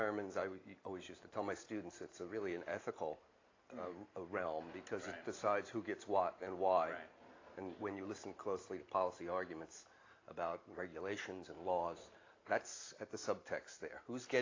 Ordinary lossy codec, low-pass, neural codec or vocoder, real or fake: AAC, 32 kbps; 7.2 kHz; none; real